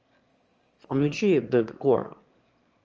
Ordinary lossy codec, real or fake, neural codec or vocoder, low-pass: Opus, 24 kbps; fake; autoencoder, 22.05 kHz, a latent of 192 numbers a frame, VITS, trained on one speaker; 7.2 kHz